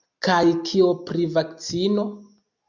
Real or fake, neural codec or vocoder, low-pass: real; none; 7.2 kHz